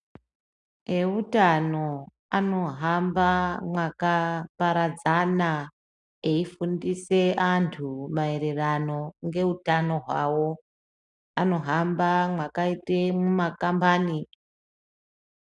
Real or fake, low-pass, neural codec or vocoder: real; 10.8 kHz; none